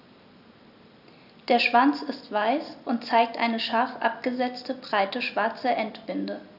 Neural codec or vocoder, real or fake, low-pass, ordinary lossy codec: none; real; 5.4 kHz; none